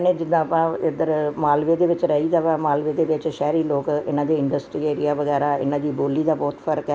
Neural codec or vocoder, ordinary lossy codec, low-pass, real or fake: none; none; none; real